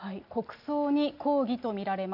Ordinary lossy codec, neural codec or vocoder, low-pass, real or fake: none; none; 5.4 kHz; real